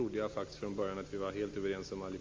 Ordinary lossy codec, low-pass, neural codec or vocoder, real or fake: Opus, 32 kbps; 7.2 kHz; none; real